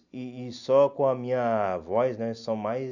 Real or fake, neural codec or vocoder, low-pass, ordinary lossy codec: real; none; 7.2 kHz; none